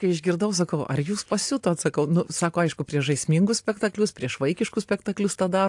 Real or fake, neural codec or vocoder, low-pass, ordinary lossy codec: real; none; 10.8 kHz; AAC, 64 kbps